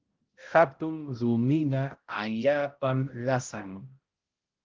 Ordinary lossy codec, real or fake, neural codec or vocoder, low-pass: Opus, 16 kbps; fake; codec, 16 kHz, 0.5 kbps, X-Codec, HuBERT features, trained on balanced general audio; 7.2 kHz